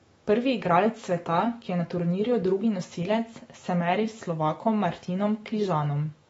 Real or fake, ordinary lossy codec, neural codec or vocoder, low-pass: fake; AAC, 24 kbps; autoencoder, 48 kHz, 128 numbers a frame, DAC-VAE, trained on Japanese speech; 19.8 kHz